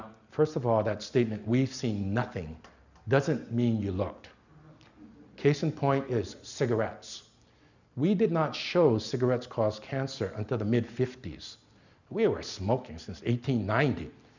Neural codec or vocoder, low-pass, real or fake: none; 7.2 kHz; real